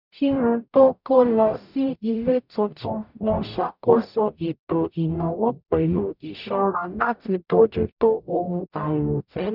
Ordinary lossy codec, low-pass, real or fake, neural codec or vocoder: none; 5.4 kHz; fake; codec, 44.1 kHz, 0.9 kbps, DAC